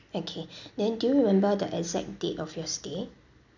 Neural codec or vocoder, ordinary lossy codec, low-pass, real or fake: none; none; 7.2 kHz; real